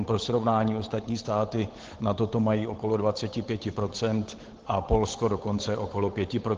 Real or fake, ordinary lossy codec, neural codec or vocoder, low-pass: fake; Opus, 16 kbps; codec, 16 kHz, 8 kbps, FunCodec, trained on Chinese and English, 25 frames a second; 7.2 kHz